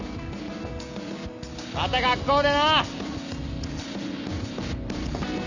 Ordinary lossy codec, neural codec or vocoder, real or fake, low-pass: none; none; real; 7.2 kHz